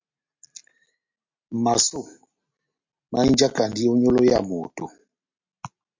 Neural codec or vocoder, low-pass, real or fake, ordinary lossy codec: none; 7.2 kHz; real; MP3, 48 kbps